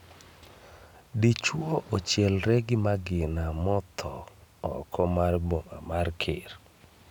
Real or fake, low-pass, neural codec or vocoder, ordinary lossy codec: real; 19.8 kHz; none; none